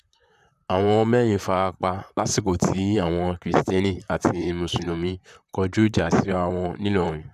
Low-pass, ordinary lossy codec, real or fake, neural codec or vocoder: 14.4 kHz; none; fake; vocoder, 44.1 kHz, 128 mel bands, Pupu-Vocoder